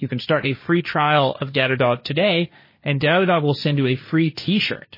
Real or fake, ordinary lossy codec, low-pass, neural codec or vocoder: fake; MP3, 24 kbps; 5.4 kHz; codec, 16 kHz, 1.1 kbps, Voila-Tokenizer